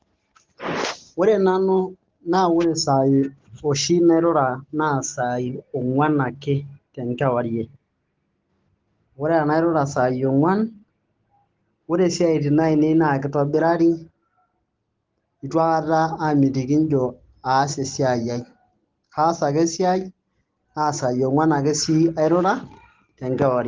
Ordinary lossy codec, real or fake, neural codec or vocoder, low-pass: Opus, 16 kbps; real; none; 7.2 kHz